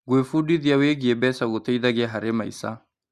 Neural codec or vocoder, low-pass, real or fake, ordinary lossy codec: none; 14.4 kHz; real; Opus, 64 kbps